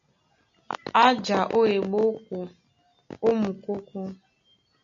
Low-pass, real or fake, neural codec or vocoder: 7.2 kHz; real; none